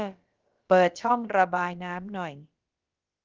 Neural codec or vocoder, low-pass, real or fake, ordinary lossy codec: codec, 16 kHz, about 1 kbps, DyCAST, with the encoder's durations; 7.2 kHz; fake; Opus, 32 kbps